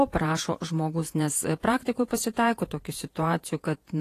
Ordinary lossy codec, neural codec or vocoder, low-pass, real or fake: AAC, 48 kbps; vocoder, 48 kHz, 128 mel bands, Vocos; 14.4 kHz; fake